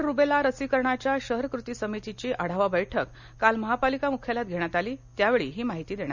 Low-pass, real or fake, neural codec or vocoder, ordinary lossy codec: 7.2 kHz; real; none; none